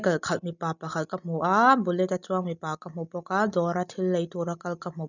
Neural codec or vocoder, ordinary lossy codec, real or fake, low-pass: none; none; real; 7.2 kHz